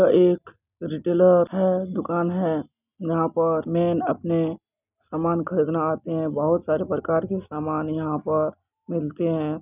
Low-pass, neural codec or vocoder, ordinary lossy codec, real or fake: 3.6 kHz; none; none; real